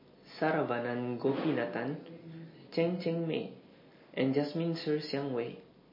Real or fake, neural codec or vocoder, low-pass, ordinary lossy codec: real; none; 5.4 kHz; MP3, 24 kbps